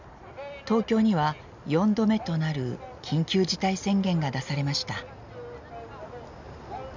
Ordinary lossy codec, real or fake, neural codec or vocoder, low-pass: none; real; none; 7.2 kHz